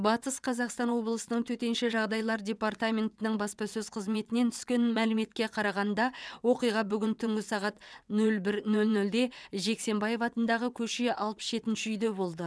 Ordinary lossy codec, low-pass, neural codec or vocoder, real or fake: none; none; vocoder, 22.05 kHz, 80 mel bands, WaveNeXt; fake